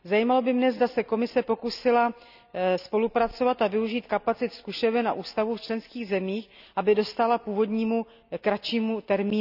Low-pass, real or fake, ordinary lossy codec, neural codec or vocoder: 5.4 kHz; real; none; none